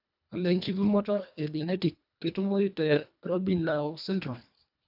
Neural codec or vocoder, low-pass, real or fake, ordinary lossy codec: codec, 24 kHz, 1.5 kbps, HILCodec; 5.4 kHz; fake; none